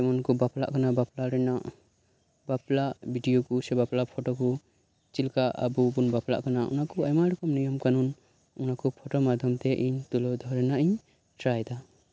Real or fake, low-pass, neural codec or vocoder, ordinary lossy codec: real; none; none; none